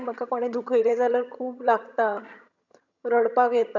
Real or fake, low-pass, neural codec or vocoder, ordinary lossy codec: fake; 7.2 kHz; vocoder, 22.05 kHz, 80 mel bands, HiFi-GAN; none